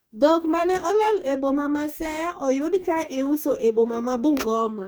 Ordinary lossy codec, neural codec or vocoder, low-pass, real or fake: none; codec, 44.1 kHz, 2.6 kbps, DAC; none; fake